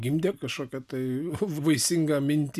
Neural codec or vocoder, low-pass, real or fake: none; 14.4 kHz; real